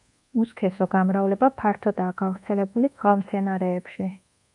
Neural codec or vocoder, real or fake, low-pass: codec, 24 kHz, 1.2 kbps, DualCodec; fake; 10.8 kHz